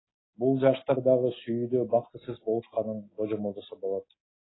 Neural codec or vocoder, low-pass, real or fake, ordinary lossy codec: none; 7.2 kHz; real; AAC, 16 kbps